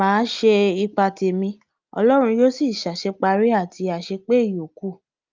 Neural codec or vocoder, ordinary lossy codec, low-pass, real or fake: none; Opus, 24 kbps; 7.2 kHz; real